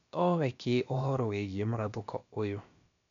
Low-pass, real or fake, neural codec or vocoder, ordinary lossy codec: 7.2 kHz; fake; codec, 16 kHz, about 1 kbps, DyCAST, with the encoder's durations; MP3, 64 kbps